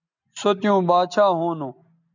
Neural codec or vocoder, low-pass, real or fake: none; 7.2 kHz; real